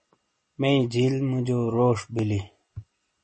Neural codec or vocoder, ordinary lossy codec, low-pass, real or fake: none; MP3, 32 kbps; 10.8 kHz; real